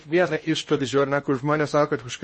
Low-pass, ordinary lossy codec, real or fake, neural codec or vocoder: 10.8 kHz; MP3, 32 kbps; fake; codec, 16 kHz in and 24 kHz out, 0.8 kbps, FocalCodec, streaming, 65536 codes